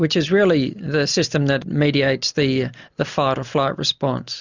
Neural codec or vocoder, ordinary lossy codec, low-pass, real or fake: none; Opus, 64 kbps; 7.2 kHz; real